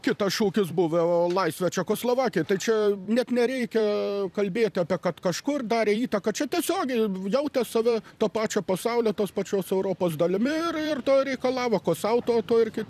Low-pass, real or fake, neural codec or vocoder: 14.4 kHz; fake; vocoder, 44.1 kHz, 128 mel bands every 256 samples, BigVGAN v2